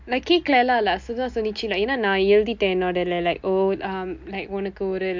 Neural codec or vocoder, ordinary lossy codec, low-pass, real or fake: none; MP3, 64 kbps; 7.2 kHz; real